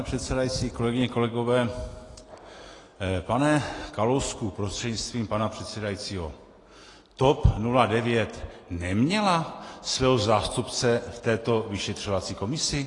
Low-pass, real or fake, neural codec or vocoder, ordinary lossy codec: 10.8 kHz; real; none; AAC, 32 kbps